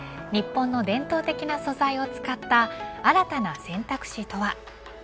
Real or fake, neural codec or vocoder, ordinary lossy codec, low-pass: real; none; none; none